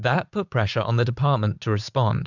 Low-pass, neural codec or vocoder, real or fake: 7.2 kHz; vocoder, 44.1 kHz, 80 mel bands, Vocos; fake